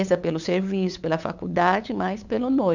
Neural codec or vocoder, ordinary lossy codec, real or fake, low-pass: codec, 16 kHz, 8 kbps, FunCodec, trained on LibriTTS, 25 frames a second; none; fake; 7.2 kHz